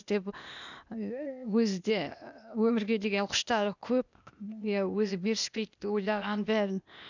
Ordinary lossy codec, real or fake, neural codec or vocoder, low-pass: none; fake; codec, 16 kHz, 0.8 kbps, ZipCodec; 7.2 kHz